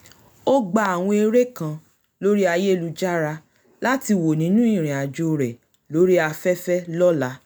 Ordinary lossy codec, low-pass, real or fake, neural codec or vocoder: none; none; real; none